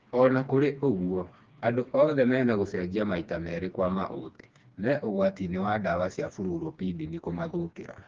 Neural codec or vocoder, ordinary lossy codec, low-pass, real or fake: codec, 16 kHz, 2 kbps, FreqCodec, smaller model; Opus, 24 kbps; 7.2 kHz; fake